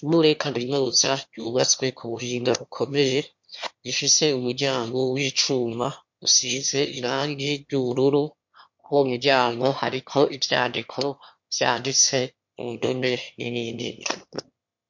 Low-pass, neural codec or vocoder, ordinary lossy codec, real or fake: 7.2 kHz; autoencoder, 22.05 kHz, a latent of 192 numbers a frame, VITS, trained on one speaker; MP3, 48 kbps; fake